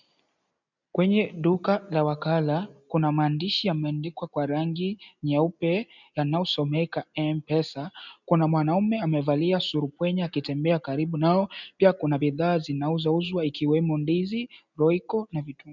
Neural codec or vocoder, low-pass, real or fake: none; 7.2 kHz; real